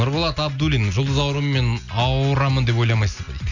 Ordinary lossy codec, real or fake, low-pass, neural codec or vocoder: none; real; 7.2 kHz; none